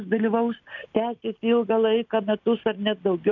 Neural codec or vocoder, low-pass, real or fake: none; 7.2 kHz; real